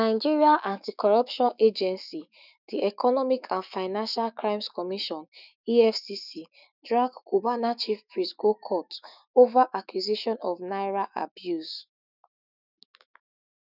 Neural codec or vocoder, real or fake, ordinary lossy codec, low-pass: autoencoder, 48 kHz, 128 numbers a frame, DAC-VAE, trained on Japanese speech; fake; none; 5.4 kHz